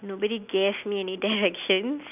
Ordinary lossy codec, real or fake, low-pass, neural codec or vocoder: none; real; 3.6 kHz; none